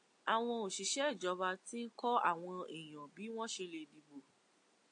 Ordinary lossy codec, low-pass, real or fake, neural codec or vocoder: AAC, 48 kbps; 9.9 kHz; real; none